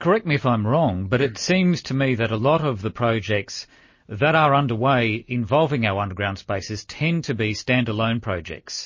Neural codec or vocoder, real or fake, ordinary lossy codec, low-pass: none; real; MP3, 32 kbps; 7.2 kHz